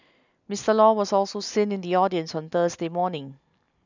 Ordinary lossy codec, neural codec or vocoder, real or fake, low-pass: none; none; real; 7.2 kHz